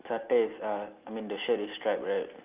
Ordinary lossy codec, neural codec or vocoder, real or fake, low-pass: Opus, 24 kbps; none; real; 3.6 kHz